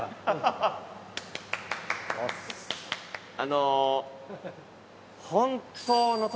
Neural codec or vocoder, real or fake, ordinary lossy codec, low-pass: none; real; none; none